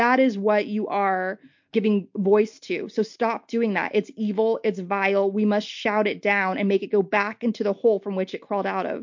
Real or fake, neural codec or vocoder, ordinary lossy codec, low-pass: real; none; MP3, 48 kbps; 7.2 kHz